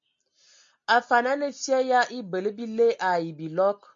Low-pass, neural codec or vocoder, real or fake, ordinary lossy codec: 7.2 kHz; none; real; MP3, 48 kbps